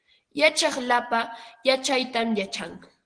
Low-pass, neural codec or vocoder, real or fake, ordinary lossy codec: 9.9 kHz; none; real; Opus, 16 kbps